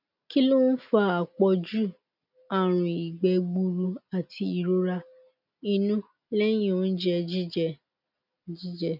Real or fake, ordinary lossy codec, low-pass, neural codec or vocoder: real; none; 5.4 kHz; none